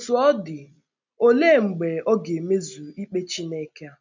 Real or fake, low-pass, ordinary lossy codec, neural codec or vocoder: real; 7.2 kHz; MP3, 64 kbps; none